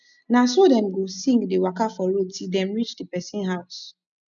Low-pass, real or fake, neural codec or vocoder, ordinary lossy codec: 7.2 kHz; real; none; none